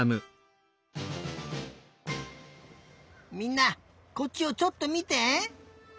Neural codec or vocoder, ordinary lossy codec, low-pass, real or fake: none; none; none; real